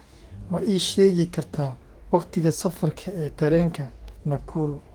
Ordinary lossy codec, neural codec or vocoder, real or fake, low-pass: Opus, 24 kbps; codec, 44.1 kHz, 2.6 kbps, DAC; fake; 19.8 kHz